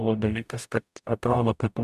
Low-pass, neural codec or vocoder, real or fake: 14.4 kHz; codec, 44.1 kHz, 0.9 kbps, DAC; fake